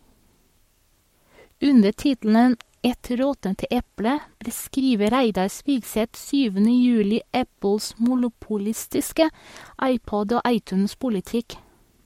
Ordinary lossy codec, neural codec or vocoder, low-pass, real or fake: MP3, 64 kbps; codec, 44.1 kHz, 7.8 kbps, Pupu-Codec; 19.8 kHz; fake